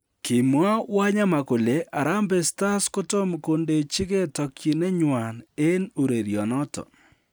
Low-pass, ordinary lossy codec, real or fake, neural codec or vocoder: none; none; real; none